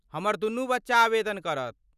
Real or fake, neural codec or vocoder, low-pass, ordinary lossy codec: real; none; 14.4 kHz; none